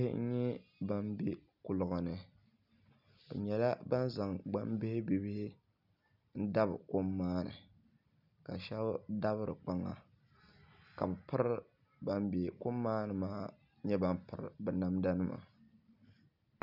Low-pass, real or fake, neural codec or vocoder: 5.4 kHz; real; none